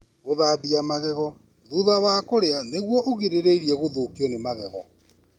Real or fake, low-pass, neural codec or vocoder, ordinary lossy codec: real; 19.8 kHz; none; Opus, 32 kbps